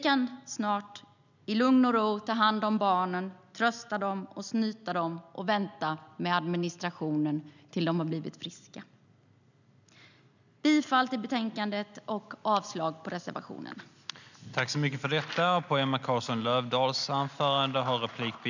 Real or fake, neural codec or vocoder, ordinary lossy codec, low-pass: real; none; none; 7.2 kHz